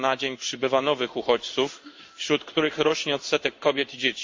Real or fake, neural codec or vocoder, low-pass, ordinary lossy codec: fake; codec, 16 kHz in and 24 kHz out, 1 kbps, XY-Tokenizer; 7.2 kHz; MP3, 48 kbps